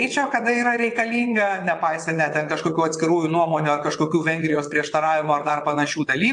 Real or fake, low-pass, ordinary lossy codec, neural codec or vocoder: fake; 9.9 kHz; AAC, 64 kbps; vocoder, 22.05 kHz, 80 mel bands, WaveNeXt